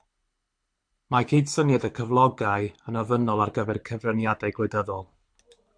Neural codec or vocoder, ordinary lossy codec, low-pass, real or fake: codec, 24 kHz, 6 kbps, HILCodec; MP3, 64 kbps; 9.9 kHz; fake